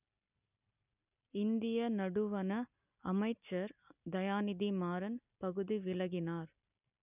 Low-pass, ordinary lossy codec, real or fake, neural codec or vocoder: 3.6 kHz; none; real; none